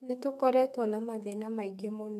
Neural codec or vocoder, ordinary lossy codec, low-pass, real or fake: codec, 32 kHz, 1.9 kbps, SNAC; none; 14.4 kHz; fake